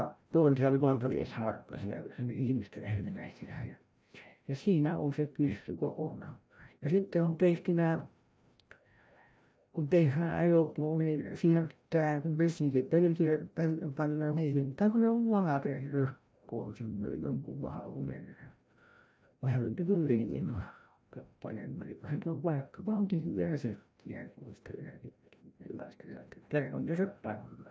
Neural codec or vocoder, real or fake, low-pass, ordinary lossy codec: codec, 16 kHz, 0.5 kbps, FreqCodec, larger model; fake; none; none